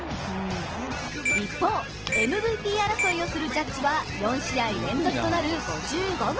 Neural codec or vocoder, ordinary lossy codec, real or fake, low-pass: none; Opus, 16 kbps; real; 7.2 kHz